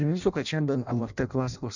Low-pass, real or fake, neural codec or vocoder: 7.2 kHz; fake; codec, 16 kHz in and 24 kHz out, 0.6 kbps, FireRedTTS-2 codec